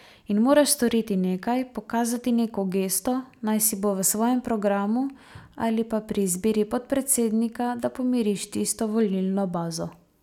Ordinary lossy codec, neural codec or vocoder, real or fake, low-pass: none; none; real; 19.8 kHz